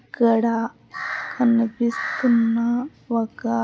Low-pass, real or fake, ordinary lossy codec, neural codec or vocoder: none; real; none; none